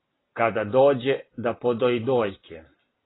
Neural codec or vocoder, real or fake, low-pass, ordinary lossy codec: none; real; 7.2 kHz; AAC, 16 kbps